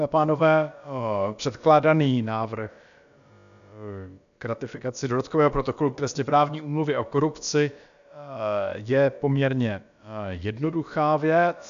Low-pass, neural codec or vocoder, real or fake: 7.2 kHz; codec, 16 kHz, about 1 kbps, DyCAST, with the encoder's durations; fake